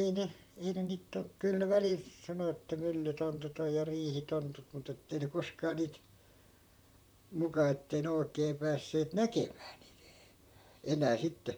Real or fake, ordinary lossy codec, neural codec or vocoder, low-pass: fake; none; vocoder, 44.1 kHz, 128 mel bands, Pupu-Vocoder; none